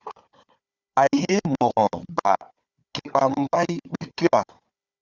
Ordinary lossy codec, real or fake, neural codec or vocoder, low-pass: Opus, 64 kbps; fake; codec, 16 kHz, 4 kbps, FunCodec, trained on Chinese and English, 50 frames a second; 7.2 kHz